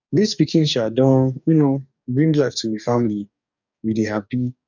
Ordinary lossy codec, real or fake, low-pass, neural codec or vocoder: none; fake; 7.2 kHz; codec, 44.1 kHz, 2.6 kbps, DAC